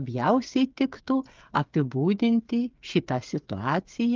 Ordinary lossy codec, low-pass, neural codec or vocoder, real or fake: Opus, 24 kbps; 7.2 kHz; codec, 16 kHz, 8 kbps, FreqCodec, smaller model; fake